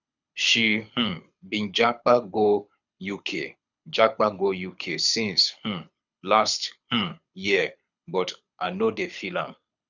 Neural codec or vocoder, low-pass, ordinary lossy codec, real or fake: codec, 24 kHz, 6 kbps, HILCodec; 7.2 kHz; none; fake